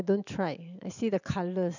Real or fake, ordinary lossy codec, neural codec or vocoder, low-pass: real; none; none; 7.2 kHz